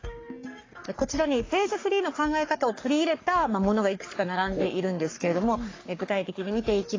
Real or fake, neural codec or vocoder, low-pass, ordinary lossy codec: fake; codec, 44.1 kHz, 3.4 kbps, Pupu-Codec; 7.2 kHz; AAC, 32 kbps